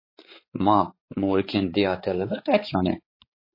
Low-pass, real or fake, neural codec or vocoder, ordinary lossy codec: 5.4 kHz; fake; codec, 16 kHz, 4 kbps, X-Codec, HuBERT features, trained on balanced general audio; MP3, 24 kbps